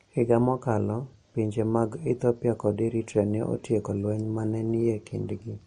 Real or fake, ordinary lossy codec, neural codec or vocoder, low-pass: real; MP3, 48 kbps; none; 19.8 kHz